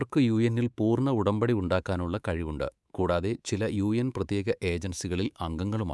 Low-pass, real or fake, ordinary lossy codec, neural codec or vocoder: none; fake; none; codec, 24 kHz, 3.1 kbps, DualCodec